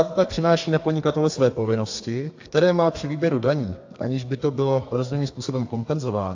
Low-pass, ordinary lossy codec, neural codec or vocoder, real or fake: 7.2 kHz; AAC, 48 kbps; codec, 32 kHz, 1.9 kbps, SNAC; fake